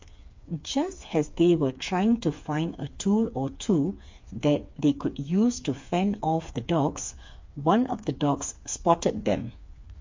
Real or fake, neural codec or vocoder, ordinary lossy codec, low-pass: fake; codec, 16 kHz, 4 kbps, FreqCodec, smaller model; MP3, 48 kbps; 7.2 kHz